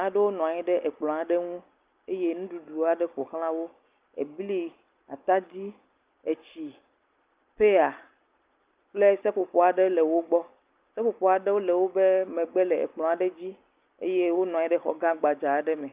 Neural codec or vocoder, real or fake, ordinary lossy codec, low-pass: none; real; Opus, 24 kbps; 3.6 kHz